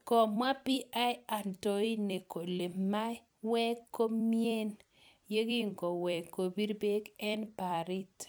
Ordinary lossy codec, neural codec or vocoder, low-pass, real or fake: none; vocoder, 44.1 kHz, 128 mel bands every 256 samples, BigVGAN v2; none; fake